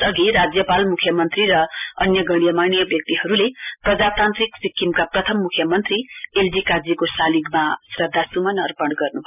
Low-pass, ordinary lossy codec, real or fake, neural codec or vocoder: 3.6 kHz; none; real; none